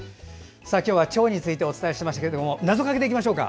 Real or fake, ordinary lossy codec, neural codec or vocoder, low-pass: real; none; none; none